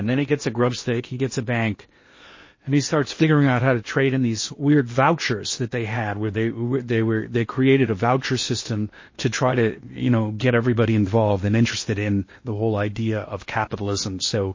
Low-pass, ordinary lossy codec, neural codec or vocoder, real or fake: 7.2 kHz; MP3, 32 kbps; codec, 16 kHz in and 24 kHz out, 0.8 kbps, FocalCodec, streaming, 65536 codes; fake